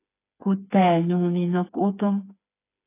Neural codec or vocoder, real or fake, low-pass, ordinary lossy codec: codec, 16 kHz, 4 kbps, FreqCodec, smaller model; fake; 3.6 kHz; AAC, 24 kbps